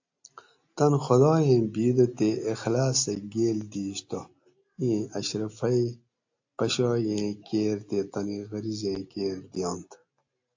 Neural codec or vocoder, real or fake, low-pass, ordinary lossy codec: none; real; 7.2 kHz; AAC, 48 kbps